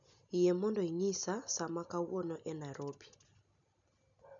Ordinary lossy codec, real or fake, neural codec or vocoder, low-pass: none; real; none; 7.2 kHz